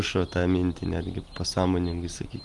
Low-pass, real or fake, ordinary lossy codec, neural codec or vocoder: 10.8 kHz; real; Opus, 24 kbps; none